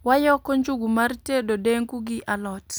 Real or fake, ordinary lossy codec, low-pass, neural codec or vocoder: real; none; none; none